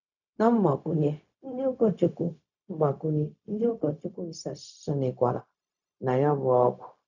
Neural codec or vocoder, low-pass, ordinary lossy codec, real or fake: codec, 16 kHz, 0.4 kbps, LongCat-Audio-Codec; 7.2 kHz; none; fake